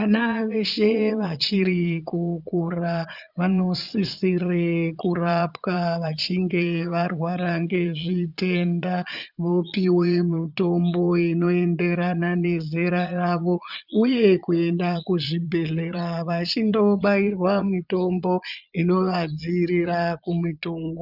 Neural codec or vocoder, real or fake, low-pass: vocoder, 22.05 kHz, 80 mel bands, Vocos; fake; 5.4 kHz